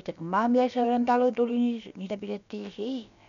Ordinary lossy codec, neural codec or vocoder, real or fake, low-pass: none; codec, 16 kHz, about 1 kbps, DyCAST, with the encoder's durations; fake; 7.2 kHz